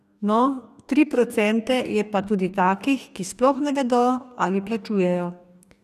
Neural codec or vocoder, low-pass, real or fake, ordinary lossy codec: codec, 44.1 kHz, 2.6 kbps, DAC; 14.4 kHz; fake; none